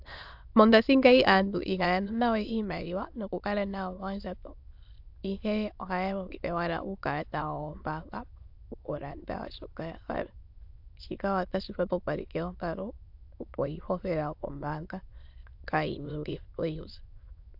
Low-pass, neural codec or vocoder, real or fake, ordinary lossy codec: 5.4 kHz; autoencoder, 22.05 kHz, a latent of 192 numbers a frame, VITS, trained on many speakers; fake; AAC, 48 kbps